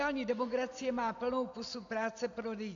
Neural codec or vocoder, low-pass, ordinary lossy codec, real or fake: none; 7.2 kHz; AAC, 64 kbps; real